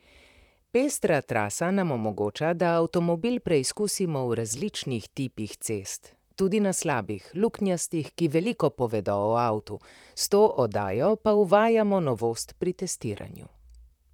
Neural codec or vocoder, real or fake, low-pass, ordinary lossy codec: vocoder, 44.1 kHz, 128 mel bands, Pupu-Vocoder; fake; 19.8 kHz; none